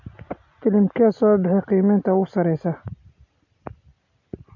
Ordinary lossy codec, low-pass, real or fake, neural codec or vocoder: AAC, 48 kbps; 7.2 kHz; real; none